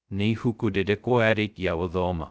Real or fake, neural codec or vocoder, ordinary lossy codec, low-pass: fake; codec, 16 kHz, 0.2 kbps, FocalCodec; none; none